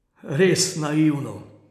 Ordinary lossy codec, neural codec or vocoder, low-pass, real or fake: none; none; 14.4 kHz; real